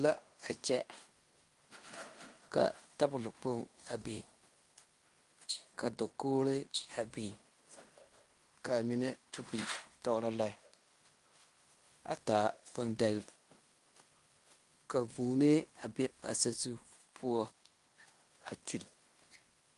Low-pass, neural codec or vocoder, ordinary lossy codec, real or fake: 10.8 kHz; codec, 16 kHz in and 24 kHz out, 0.9 kbps, LongCat-Audio-Codec, fine tuned four codebook decoder; Opus, 32 kbps; fake